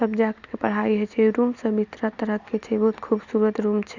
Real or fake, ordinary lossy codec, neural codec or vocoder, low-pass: real; Opus, 64 kbps; none; 7.2 kHz